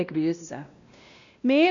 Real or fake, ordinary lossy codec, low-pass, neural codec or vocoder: fake; AAC, 64 kbps; 7.2 kHz; codec, 16 kHz, 1 kbps, X-Codec, WavLM features, trained on Multilingual LibriSpeech